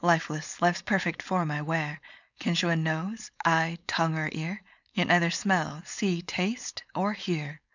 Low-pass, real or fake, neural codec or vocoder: 7.2 kHz; fake; codec, 16 kHz, 4.8 kbps, FACodec